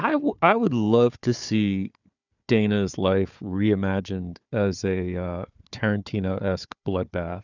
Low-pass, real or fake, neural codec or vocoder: 7.2 kHz; fake; codec, 16 kHz, 4 kbps, FunCodec, trained on Chinese and English, 50 frames a second